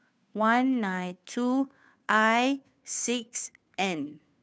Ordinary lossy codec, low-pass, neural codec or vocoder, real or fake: none; none; codec, 16 kHz, 2 kbps, FunCodec, trained on Chinese and English, 25 frames a second; fake